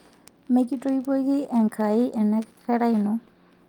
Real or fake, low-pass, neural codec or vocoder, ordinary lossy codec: real; 19.8 kHz; none; Opus, 32 kbps